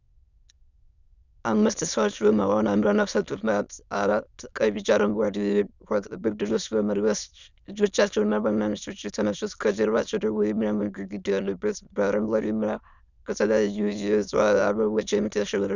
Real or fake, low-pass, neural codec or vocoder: fake; 7.2 kHz; autoencoder, 22.05 kHz, a latent of 192 numbers a frame, VITS, trained on many speakers